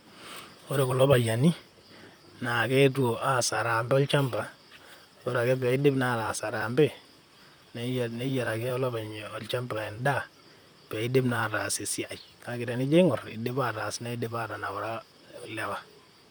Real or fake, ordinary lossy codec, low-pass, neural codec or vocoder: fake; none; none; vocoder, 44.1 kHz, 128 mel bands, Pupu-Vocoder